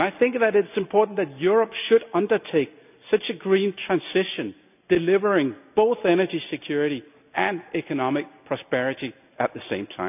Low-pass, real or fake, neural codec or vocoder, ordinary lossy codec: 3.6 kHz; real; none; none